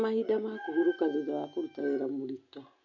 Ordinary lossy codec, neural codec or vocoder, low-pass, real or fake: none; none; 7.2 kHz; real